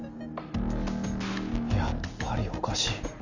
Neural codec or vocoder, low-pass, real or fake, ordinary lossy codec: none; 7.2 kHz; real; none